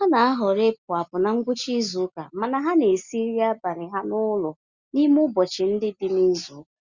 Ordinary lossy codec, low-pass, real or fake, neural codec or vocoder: Opus, 64 kbps; 7.2 kHz; real; none